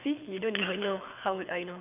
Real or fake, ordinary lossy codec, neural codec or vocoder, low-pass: fake; none; codec, 24 kHz, 6 kbps, HILCodec; 3.6 kHz